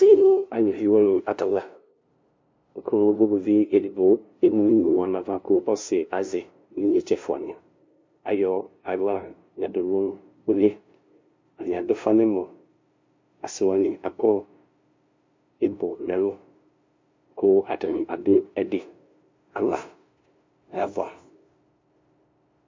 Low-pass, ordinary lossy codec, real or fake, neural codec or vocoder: 7.2 kHz; MP3, 48 kbps; fake; codec, 16 kHz, 0.5 kbps, FunCodec, trained on LibriTTS, 25 frames a second